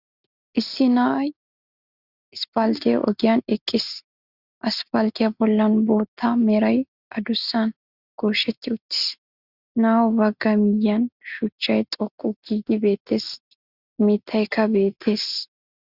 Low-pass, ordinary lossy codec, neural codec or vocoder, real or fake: 5.4 kHz; Opus, 64 kbps; none; real